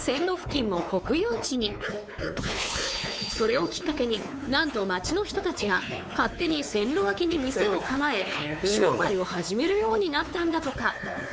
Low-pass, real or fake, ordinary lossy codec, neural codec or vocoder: none; fake; none; codec, 16 kHz, 4 kbps, X-Codec, WavLM features, trained on Multilingual LibriSpeech